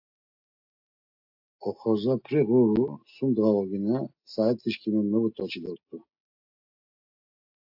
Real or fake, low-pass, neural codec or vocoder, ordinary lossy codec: real; 5.4 kHz; none; AAC, 48 kbps